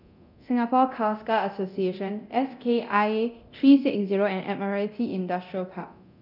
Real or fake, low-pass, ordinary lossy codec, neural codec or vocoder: fake; 5.4 kHz; none; codec, 24 kHz, 0.9 kbps, DualCodec